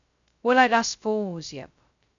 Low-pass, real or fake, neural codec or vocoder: 7.2 kHz; fake; codec, 16 kHz, 0.2 kbps, FocalCodec